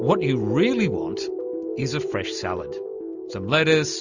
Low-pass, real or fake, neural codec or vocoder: 7.2 kHz; real; none